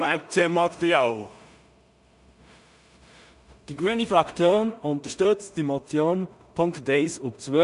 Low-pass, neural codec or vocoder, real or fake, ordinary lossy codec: 10.8 kHz; codec, 16 kHz in and 24 kHz out, 0.4 kbps, LongCat-Audio-Codec, two codebook decoder; fake; none